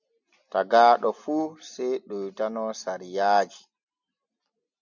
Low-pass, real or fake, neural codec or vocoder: 7.2 kHz; real; none